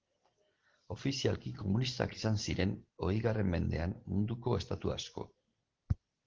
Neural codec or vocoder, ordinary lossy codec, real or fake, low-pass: none; Opus, 16 kbps; real; 7.2 kHz